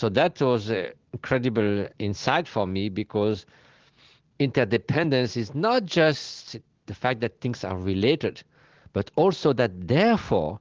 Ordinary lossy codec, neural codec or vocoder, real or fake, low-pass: Opus, 16 kbps; none; real; 7.2 kHz